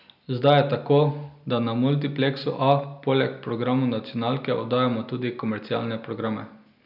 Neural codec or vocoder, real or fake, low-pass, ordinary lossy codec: none; real; 5.4 kHz; none